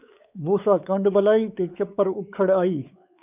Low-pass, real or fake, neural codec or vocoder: 3.6 kHz; fake; codec, 16 kHz, 4 kbps, X-Codec, WavLM features, trained on Multilingual LibriSpeech